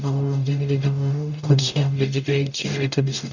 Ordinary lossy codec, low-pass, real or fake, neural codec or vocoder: none; 7.2 kHz; fake; codec, 44.1 kHz, 0.9 kbps, DAC